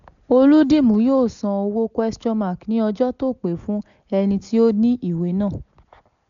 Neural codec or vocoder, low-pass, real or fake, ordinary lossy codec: none; 7.2 kHz; real; none